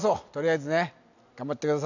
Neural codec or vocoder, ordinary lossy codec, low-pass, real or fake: none; none; 7.2 kHz; real